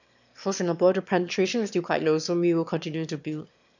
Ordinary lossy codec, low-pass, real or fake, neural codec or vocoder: none; 7.2 kHz; fake; autoencoder, 22.05 kHz, a latent of 192 numbers a frame, VITS, trained on one speaker